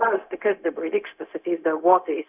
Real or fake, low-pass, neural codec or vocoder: fake; 3.6 kHz; codec, 16 kHz, 0.4 kbps, LongCat-Audio-Codec